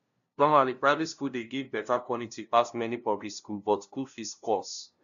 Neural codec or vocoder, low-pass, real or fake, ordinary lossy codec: codec, 16 kHz, 0.5 kbps, FunCodec, trained on LibriTTS, 25 frames a second; 7.2 kHz; fake; none